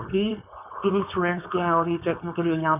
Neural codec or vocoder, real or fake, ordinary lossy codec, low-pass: codec, 16 kHz, 4.8 kbps, FACodec; fake; none; 3.6 kHz